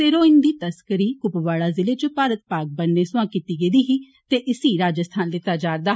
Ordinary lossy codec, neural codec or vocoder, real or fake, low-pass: none; none; real; none